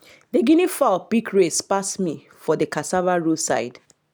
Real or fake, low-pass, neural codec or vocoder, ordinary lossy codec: real; none; none; none